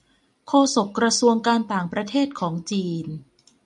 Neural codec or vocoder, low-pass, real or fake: none; 10.8 kHz; real